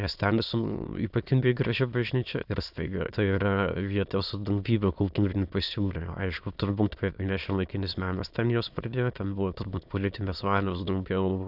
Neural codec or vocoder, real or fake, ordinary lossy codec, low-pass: autoencoder, 22.05 kHz, a latent of 192 numbers a frame, VITS, trained on many speakers; fake; Opus, 64 kbps; 5.4 kHz